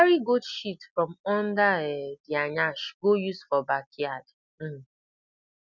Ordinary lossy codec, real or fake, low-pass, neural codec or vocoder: none; real; 7.2 kHz; none